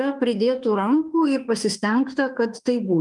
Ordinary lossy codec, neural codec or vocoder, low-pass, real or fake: Opus, 32 kbps; autoencoder, 48 kHz, 32 numbers a frame, DAC-VAE, trained on Japanese speech; 10.8 kHz; fake